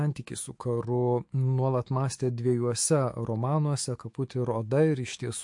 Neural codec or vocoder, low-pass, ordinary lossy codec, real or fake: autoencoder, 48 kHz, 128 numbers a frame, DAC-VAE, trained on Japanese speech; 10.8 kHz; MP3, 48 kbps; fake